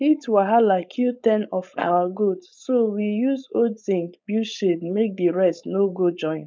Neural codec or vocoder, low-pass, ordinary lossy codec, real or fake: codec, 16 kHz, 4.8 kbps, FACodec; none; none; fake